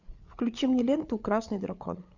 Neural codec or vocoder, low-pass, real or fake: codec, 16 kHz, 8 kbps, FreqCodec, larger model; 7.2 kHz; fake